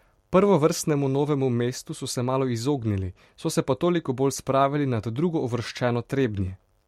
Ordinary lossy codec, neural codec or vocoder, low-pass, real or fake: MP3, 64 kbps; vocoder, 44.1 kHz, 128 mel bands every 256 samples, BigVGAN v2; 19.8 kHz; fake